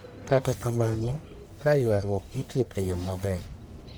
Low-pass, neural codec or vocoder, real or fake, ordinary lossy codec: none; codec, 44.1 kHz, 1.7 kbps, Pupu-Codec; fake; none